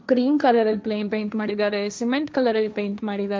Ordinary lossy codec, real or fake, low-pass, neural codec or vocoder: none; fake; none; codec, 16 kHz, 1.1 kbps, Voila-Tokenizer